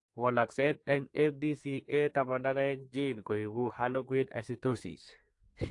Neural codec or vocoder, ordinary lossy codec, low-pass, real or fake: codec, 32 kHz, 1.9 kbps, SNAC; AAC, 48 kbps; 10.8 kHz; fake